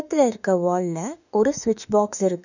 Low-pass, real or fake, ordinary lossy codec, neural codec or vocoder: 7.2 kHz; fake; none; autoencoder, 48 kHz, 32 numbers a frame, DAC-VAE, trained on Japanese speech